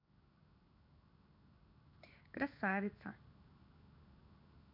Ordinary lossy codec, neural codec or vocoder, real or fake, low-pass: none; codec, 16 kHz in and 24 kHz out, 1 kbps, XY-Tokenizer; fake; 5.4 kHz